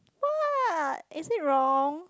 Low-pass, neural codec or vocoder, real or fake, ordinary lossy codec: none; none; real; none